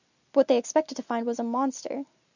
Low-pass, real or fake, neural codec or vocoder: 7.2 kHz; real; none